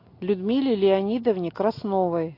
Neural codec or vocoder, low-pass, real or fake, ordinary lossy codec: none; 5.4 kHz; real; AAC, 32 kbps